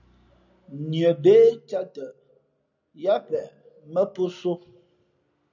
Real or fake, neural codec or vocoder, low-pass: real; none; 7.2 kHz